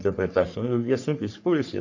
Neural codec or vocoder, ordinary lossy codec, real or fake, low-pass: codec, 44.1 kHz, 3.4 kbps, Pupu-Codec; MP3, 64 kbps; fake; 7.2 kHz